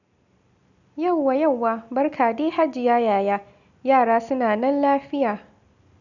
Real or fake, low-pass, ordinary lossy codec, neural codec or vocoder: real; 7.2 kHz; none; none